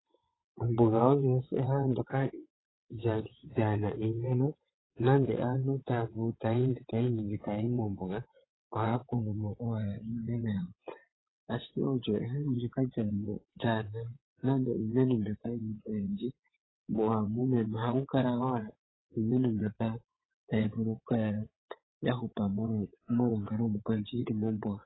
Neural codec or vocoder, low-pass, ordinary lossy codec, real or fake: vocoder, 22.05 kHz, 80 mel bands, WaveNeXt; 7.2 kHz; AAC, 16 kbps; fake